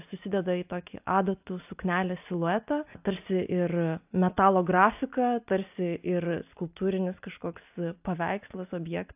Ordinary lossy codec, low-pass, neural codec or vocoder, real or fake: AAC, 32 kbps; 3.6 kHz; none; real